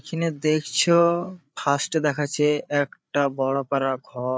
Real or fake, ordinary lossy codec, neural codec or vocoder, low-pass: fake; none; codec, 16 kHz, 8 kbps, FreqCodec, larger model; none